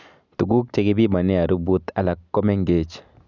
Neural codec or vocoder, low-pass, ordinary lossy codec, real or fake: none; 7.2 kHz; none; real